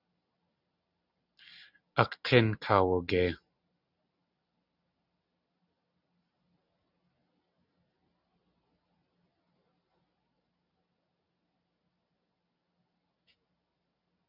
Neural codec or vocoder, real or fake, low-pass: none; real; 5.4 kHz